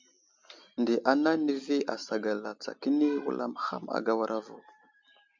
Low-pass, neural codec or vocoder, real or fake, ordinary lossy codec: 7.2 kHz; none; real; MP3, 64 kbps